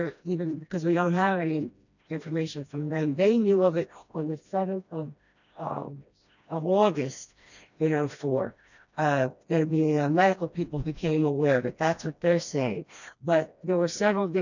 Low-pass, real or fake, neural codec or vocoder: 7.2 kHz; fake; codec, 16 kHz, 1 kbps, FreqCodec, smaller model